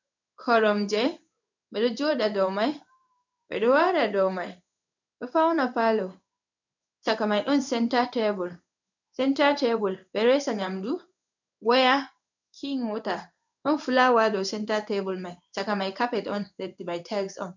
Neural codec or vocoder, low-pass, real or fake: codec, 16 kHz in and 24 kHz out, 1 kbps, XY-Tokenizer; 7.2 kHz; fake